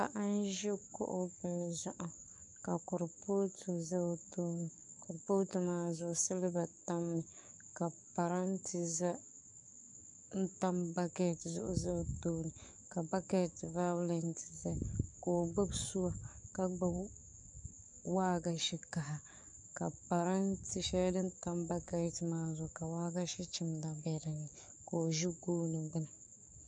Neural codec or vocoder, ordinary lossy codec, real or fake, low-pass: codec, 44.1 kHz, 7.8 kbps, DAC; MP3, 96 kbps; fake; 10.8 kHz